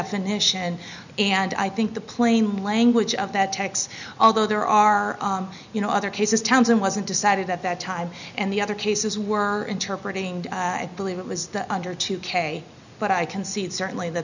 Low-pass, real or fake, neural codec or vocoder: 7.2 kHz; real; none